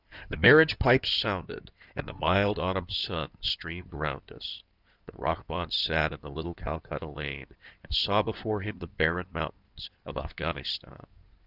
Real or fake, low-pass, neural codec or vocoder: fake; 5.4 kHz; codec, 16 kHz in and 24 kHz out, 2.2 kbps, FireRedTTS-2 codec